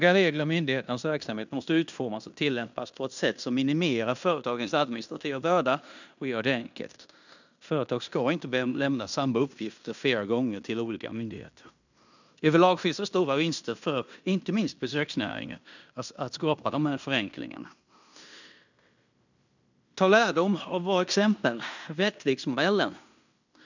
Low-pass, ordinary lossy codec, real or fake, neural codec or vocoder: 7.2 kHz; none; fake; codec, 16 kHz in and 24 kHz out, 0.9 kbps, LongCat-Audio-Codec, fine tuned four codebook decoder